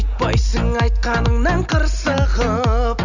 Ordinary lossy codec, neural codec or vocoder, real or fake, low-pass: none; none; real; 7.2 kHz